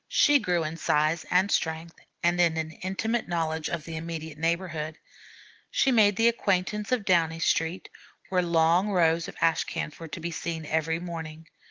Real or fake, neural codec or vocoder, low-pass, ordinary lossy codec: real; none; 7.2 kHz; Opus, 16 kbps